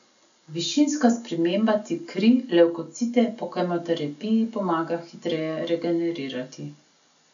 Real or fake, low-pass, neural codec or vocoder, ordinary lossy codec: real; 7.2 kHz; none; none